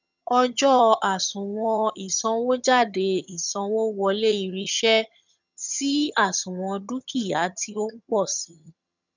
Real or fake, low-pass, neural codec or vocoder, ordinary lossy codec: fake; 7.2 kHz; vocoder, 22.05 kHz, 80 mel bands, HiFi-GAN; none